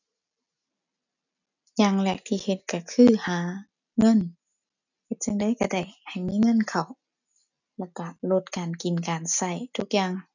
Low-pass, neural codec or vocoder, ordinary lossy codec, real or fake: 7.2 kHz; none; none; real